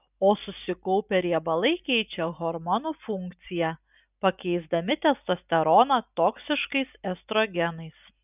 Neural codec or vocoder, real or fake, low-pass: none; real; 3.6 kHz